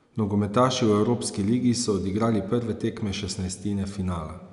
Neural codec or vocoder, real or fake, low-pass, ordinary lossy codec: none; real; 10.8 kHz; none